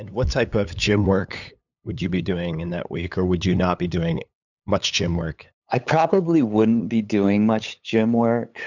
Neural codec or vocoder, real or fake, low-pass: codec, 16 kHz, 8 kbps, FunCodec, trained on LibriTTS, 25 frames a second; fake; 7.2 kHz